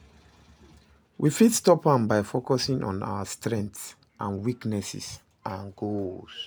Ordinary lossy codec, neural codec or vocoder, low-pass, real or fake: none; none; none; real